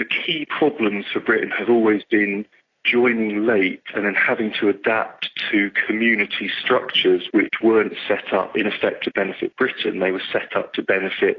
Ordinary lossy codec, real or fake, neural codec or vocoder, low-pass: AAC, 32 kbps; real; none; 7.2 kHz